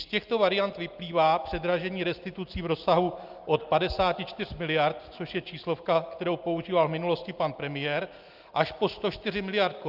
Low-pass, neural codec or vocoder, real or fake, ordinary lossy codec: 5.4 kHz; none; real; Opus, 32 kbps